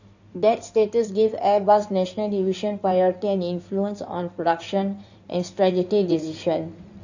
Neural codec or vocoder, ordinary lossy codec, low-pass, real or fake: codec, 16 kHz in and 24 kHz out, 2.2 kbps, FireRedTTS-2 codec; none; 7.2 kHz; fake